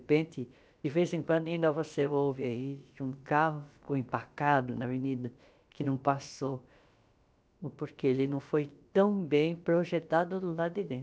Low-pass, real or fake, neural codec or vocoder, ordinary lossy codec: none; fake; codec, 16 kHz, about 1 kbps, DyCAST, with the encoder's durations; none